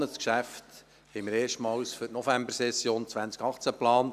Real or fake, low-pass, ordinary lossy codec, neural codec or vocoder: real; 14.4 kHz; none; none